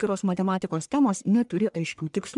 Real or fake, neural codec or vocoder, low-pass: fake; codec, 44.1 kHz, 1.7 kbps, Pupu-Codec; 10.8 kHz